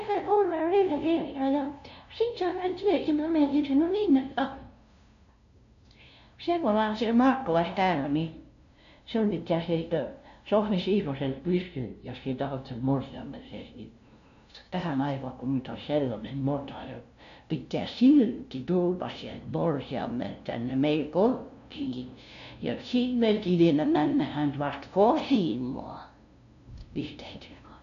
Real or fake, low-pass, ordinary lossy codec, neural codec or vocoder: fake; 7.2 kHz; none; codec, 16 kHz, 0.5 kbps, FunCodec, trained on LibriTTS, 25 frames a second